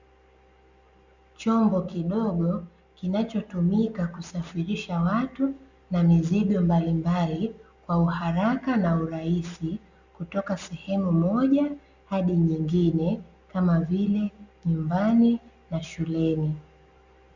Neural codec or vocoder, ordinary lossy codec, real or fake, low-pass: none; Opus, 64 kbps; real; 7.2 kHz